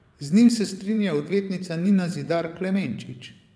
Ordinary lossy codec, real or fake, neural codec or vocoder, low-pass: none; fake; vocoder, 22.05 kHz, 80 mel bands, WaveNeXt; none